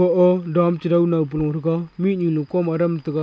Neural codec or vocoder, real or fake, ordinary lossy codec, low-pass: none; real; none; none